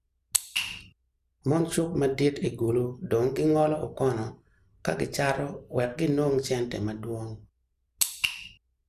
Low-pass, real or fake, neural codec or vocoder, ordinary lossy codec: 14.4 kHz; real; none; none